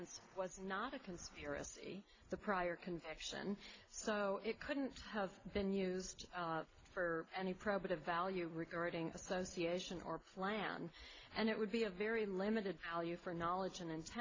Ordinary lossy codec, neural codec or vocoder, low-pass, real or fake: AAC, 32 kbps; none; 7.2 kHz; real